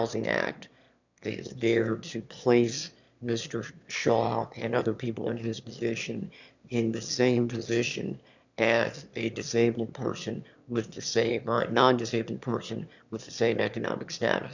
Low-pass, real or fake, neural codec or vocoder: 7.2 kHz; fake; autoencoder, 22.05 kHz, a latent of 192 numbers a frame, VITS, trained on one speaker